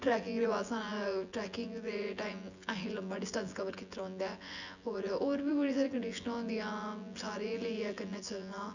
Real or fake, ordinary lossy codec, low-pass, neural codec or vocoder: fake; none; 7.2 kHz; vocoder, 24 kHz, 100 mel bands, Vocos